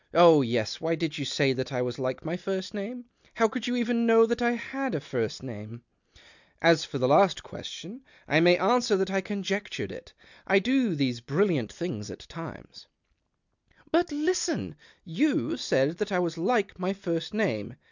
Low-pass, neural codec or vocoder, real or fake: 7.2 kHz; none; real